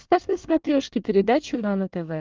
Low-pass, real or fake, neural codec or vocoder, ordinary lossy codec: 7.2 kHz; fake; codec, 24 kHz, 1 kbps, SNAC; Opus, 32 kbps